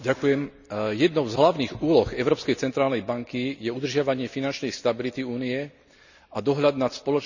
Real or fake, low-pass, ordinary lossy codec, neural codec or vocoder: real; 7.2 kHz; none; none